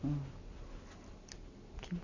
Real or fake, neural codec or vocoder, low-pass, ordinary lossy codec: real; none; 7.2 kHz; none